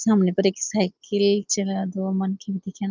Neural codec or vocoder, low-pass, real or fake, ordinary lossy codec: none; 7.2 kHz; real; Opus, 32 kbps